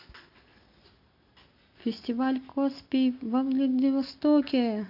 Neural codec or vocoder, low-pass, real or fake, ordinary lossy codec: none; 5.4 kHz; real; none